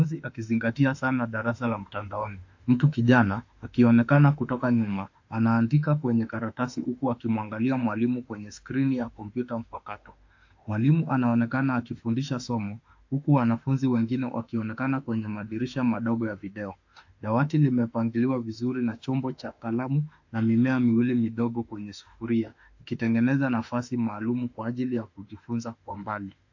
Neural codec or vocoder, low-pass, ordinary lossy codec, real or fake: autoencoder, 48 kHz, 32 numbers a frame, DAC-VAE, trained on Japanese speech; 7.2 kHz; AAC, 48 kbps; fake